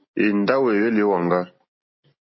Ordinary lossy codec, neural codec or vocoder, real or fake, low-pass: MP3, 24 kbps; none; real; 7.2 kHz